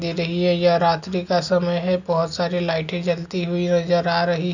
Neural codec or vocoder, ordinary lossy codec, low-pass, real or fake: none; none; 7.2 kHz; real